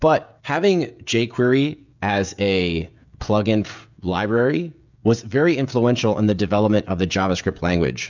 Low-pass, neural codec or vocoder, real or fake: 7.2 kHz; codec, 16 kHz, 16 kbps, FreqCodec, smaller model; fake